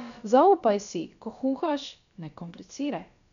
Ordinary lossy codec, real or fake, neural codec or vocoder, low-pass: none; fake; codec, 16 kHz, about 1 kbps, DyCAST, with the encoder's durations; 7.2 kHz